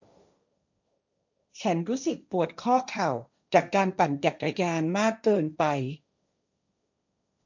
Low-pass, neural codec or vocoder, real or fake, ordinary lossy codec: 7.2 kHz; codec, 16 kHz, 1.1 kbps, Voila-Tokenizer; fake; none